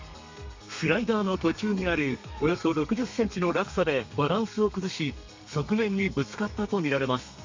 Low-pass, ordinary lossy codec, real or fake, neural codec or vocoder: 7.2 kHz; MP3, 64 kbps; fake; codec, 32 kHz, 1.9 kbps, SNAC